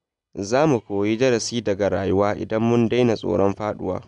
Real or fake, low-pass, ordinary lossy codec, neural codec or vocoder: real; 10.8 kHz; none; none